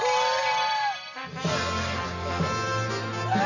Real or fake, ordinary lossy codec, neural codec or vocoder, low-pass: real; none; none; 7.2 kHz